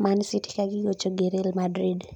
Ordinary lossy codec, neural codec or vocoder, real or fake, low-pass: none; none; real; 19.8 kHz